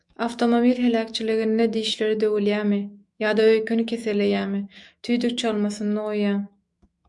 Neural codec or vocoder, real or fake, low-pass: autoencoder, 48 kHz, 128 numbers a frame, DAC-VAE, trained on Japanese speech; fake; 10.8 kHz